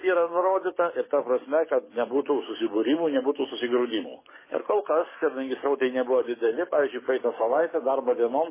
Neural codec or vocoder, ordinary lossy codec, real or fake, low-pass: codec, 44.1 kHz, 7.8 kbps, DAC; MP3, 16 kbps; fake; 3.6 kHz